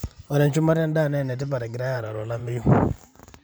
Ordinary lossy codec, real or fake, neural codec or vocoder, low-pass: none; fake; vocoder, 44.1 kHz, 128 mel bands every 512 samples, BigVGAN v2; none